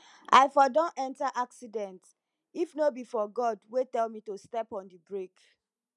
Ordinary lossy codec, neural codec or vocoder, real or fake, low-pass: none; none; real; 10.8 kHz